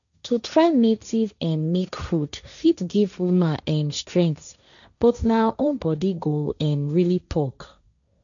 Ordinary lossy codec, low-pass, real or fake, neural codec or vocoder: none; 7.2 kHz; fake; codec, 16 kHz, 1.1 kbps, Voila-Tokenizer